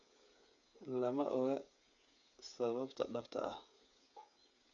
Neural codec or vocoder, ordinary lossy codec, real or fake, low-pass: codec, 16 kHz, 8 kbps, FreqCodec, smaller model; none; fake; 7.2 kHz